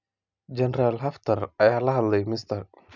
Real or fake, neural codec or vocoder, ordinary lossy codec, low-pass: real; none; none; 7.2 kHz